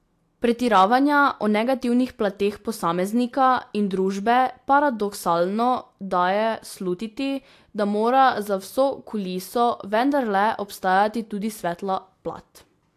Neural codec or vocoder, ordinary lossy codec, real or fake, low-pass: none; AAC, 64 kbps; real; 14.4 kHz